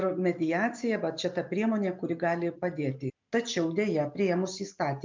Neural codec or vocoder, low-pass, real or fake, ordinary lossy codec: none; 7.2 kHz; real; MP3, 64 kbps